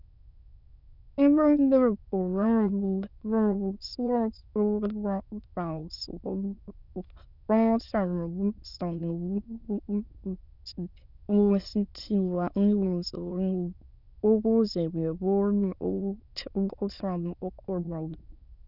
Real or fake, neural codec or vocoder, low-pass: fake; autoencoder, 22.05 kHz, a latent of 192 numbers a frame, VITS, trained on many speakers; 5.4 kHz